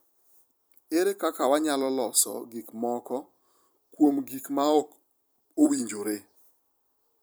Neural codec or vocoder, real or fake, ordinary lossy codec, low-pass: vocoder, 44.1 kHz, 128 mel bands every 256 samples, BigVGAN v2; fake; none; none